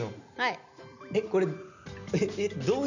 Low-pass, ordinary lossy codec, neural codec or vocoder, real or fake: 7.2 kHz; none; none; real